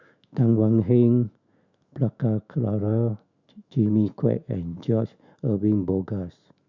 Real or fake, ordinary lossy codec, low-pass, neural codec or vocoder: fake; Opus, 64 kbps; 7.2 kHz; autoencoder, 48 kHz, 128 numbers a frame, DAC-VAE, trained on Japanese speech